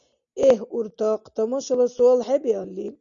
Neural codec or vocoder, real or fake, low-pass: none; real; 7.2 kHz